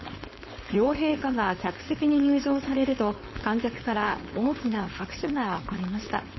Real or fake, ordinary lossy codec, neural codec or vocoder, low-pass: fake; MP3, 24 kbps; codec, 16 kHz, 4.8 kbps, FACodec; 7.2 kHz